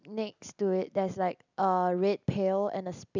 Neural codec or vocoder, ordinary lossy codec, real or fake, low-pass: none; none; real; 7.2 kHz